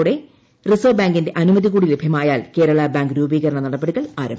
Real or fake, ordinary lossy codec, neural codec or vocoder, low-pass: real; none; none; none